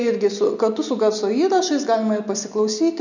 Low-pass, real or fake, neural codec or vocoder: 7.2 kHz; real; none